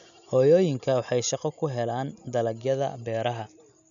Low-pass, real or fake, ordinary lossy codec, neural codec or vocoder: 7.2 kHz; real; none; none